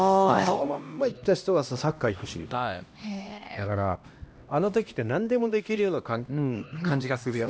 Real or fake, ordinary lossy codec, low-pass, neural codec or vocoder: fake; none; none; codec, 16 kHz, 1 kbps, X-Codec, HuBERT features, trained on LibriSpeech